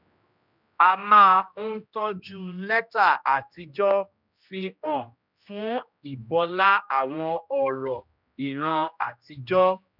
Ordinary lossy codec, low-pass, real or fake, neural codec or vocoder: none; 5.4 kHz; fake; codec, 16 kHz, 1 kbps, X-Codec, HuBERT features, trained on general audio